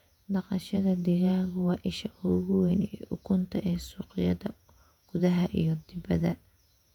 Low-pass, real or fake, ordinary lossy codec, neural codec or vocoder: 19.8 kHz; fake; none; vocoder, 48 kHz, 128 mel bands, Vocos